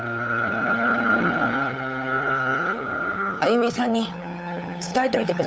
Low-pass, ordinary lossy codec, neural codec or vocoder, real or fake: none; none; codec, 16 kHz, 8 kbps, FunCodec, trained on LibriTTS, 25 frames a second; fake